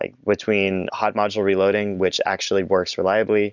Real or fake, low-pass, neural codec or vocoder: real; 7.2 kHz; none